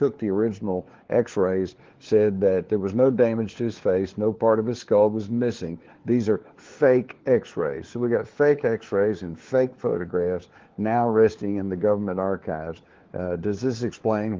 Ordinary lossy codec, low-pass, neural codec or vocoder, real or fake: Opus, 16 kbps; 7.2 kHz; codec, 16 kHz, 4 kbps, FunCodec, trained on LibriTTS, 50 frames a second; fake